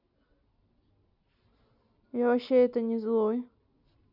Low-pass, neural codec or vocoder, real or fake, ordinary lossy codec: 5.4 kHz; none; real; none